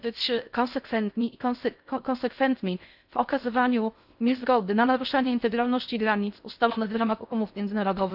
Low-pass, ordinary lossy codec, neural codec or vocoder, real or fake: 5.4 kHz; none; codec, 16 kHz in and 24 kHz out, 0.6 kbps, FocalCodec, streaming, 4096 codes; fake